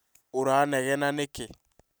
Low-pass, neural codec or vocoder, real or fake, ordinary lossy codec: none; none; real; none